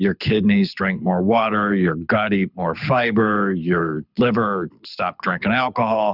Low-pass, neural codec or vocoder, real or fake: 5.4 kHz; codec, 24 kHz, 6 kbps, HILCodec; fake